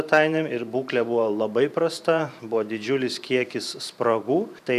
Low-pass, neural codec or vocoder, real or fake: 14.4 kHz; vocoder, 44.1 kHz, 128 mel bands every 512 samples, BigVGAN v2; fake